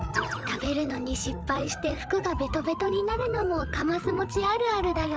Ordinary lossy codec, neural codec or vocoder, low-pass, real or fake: none; codec, 16 kHz, 8 kbps, FreqCodec, larger model; none; fake